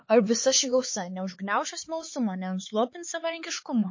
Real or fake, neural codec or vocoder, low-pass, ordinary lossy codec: fake; codec, 16 kHz, 4 kbps, X-Codec, HuBERT features, trained on LibriSpeech; 7.2 kHz; MP3, 32 kbps